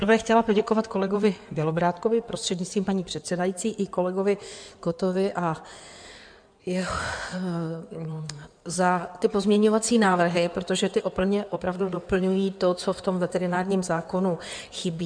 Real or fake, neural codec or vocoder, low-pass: fake; codec, 16 kHz in and 24 kHz out, 2.2 kbps, FireRedTTS-2 codec; 9.9 kHz